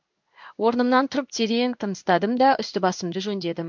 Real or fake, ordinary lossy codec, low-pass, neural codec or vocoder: fake; MP3, 64 kbps; 7.2 kHz; codec, 16 kHz in and 24 kHz out, 1 kbps, XY-Tokenizer